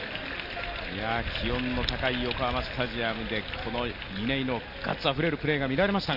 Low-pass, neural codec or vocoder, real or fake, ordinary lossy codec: 5.4 kHz; none; real; none